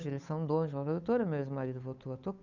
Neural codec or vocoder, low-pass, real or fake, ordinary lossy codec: codec, 16 kHz, 8 kbps, FunCodec, trained on Chinese and English, 25 frames a second; 7.2 kHz; fake; none